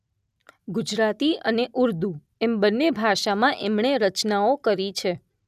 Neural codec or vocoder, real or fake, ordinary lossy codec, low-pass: none; real; none; 14.4 kHz